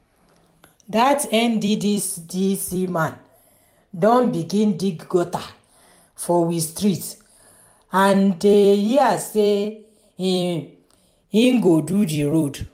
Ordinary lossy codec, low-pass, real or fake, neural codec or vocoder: MP3, 96 kbps; 19.8 kHz; fake; vocoder, 44.1 kHz, 128 mel bands every 256 samples, BigVGAN v2